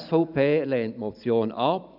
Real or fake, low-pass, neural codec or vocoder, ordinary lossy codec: fake; 5.4 kHz; vocoder, 44.1 kHz, 128 mel bands every 512 samples, BigVGAN v2; none